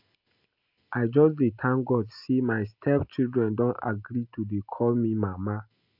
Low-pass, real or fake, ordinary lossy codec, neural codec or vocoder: 5.4 kHz; real; AAC, 48 kbps; none